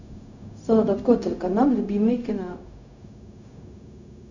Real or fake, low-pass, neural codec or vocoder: fake; 7.2 kHz; codec, 16 kHz, 0.4 kbps, LongCat-Audio-Codec